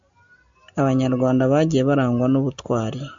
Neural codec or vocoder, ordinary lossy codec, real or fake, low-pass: none; MP3, 64 kbps; real; 7.2 kHz